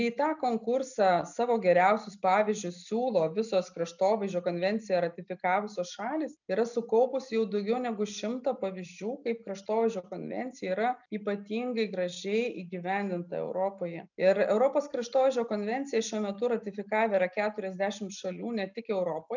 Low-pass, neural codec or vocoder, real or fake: 7.2 kHz; none; real